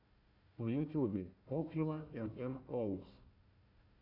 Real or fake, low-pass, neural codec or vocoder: fake; 5.4 kHz; codec, 16 kHz, 1 kbps, FunCodec, trained on Chinese and English, 50 frames a second